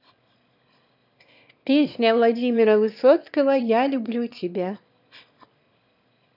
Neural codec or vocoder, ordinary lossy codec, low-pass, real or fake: autoencoder, 22.05 kHz, a latent of 192 numbers a frame, VITS, trained on one speaker; none; 5.4 kHz; fake